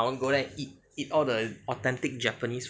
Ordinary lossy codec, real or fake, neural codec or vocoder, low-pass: none; real; none; none